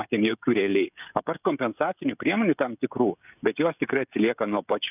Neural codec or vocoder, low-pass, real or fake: codec, 16 kHz, 16 kbps, FreqCodec, smaller model; 3.6 kHz; fake